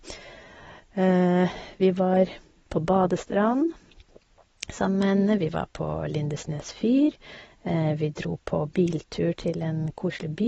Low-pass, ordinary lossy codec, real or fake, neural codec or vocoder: 19.8 kHz; AAC, 24 kbps; real; none